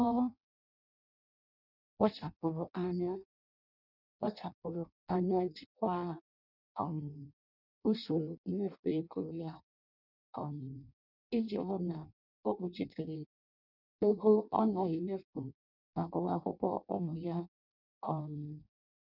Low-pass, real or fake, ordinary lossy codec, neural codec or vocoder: 5.4 kHz; fake; none; codec, 16 kHz in and 24 kHz out, 0.6 kbps, FireRedTTS-2 codec